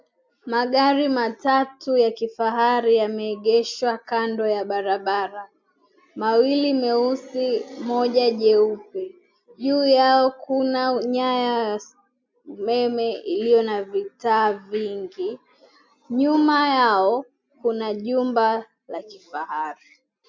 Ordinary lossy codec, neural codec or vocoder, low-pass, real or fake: MP3, 64 kbps; none; 7.2 kHz; real